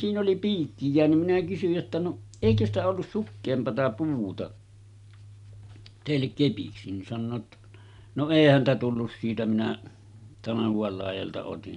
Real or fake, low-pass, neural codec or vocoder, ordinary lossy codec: real; 10.8 kHz; none; none